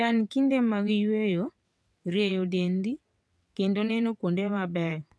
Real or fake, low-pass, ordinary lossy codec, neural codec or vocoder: fake; none; none; vocoder, 22.05 kHz, 80 mel bands, WaveNeXt